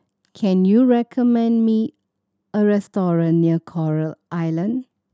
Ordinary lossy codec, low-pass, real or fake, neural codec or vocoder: none; none; real; none